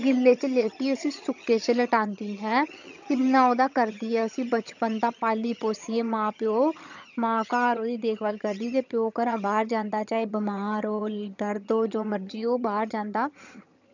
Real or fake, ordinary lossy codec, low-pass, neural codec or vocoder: fake; none; 7.2 kHz; vocoder, 22.05 kHz, 80 mel bands, HiFi-GAN